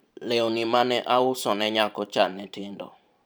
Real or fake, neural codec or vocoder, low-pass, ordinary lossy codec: fake; vocoder, 44.1 kHz, 128 mel bands every 512 samples, BigVGAN v2; none; none